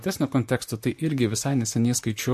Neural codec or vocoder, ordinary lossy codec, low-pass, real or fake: vocoder, 44.1 kHz, 128 mel bands, Pupu-Vocoder; MP3, 64 kbps; 14.4 kHz; fake